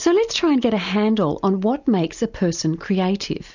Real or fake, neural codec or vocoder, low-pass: real; none; 7.2 kHz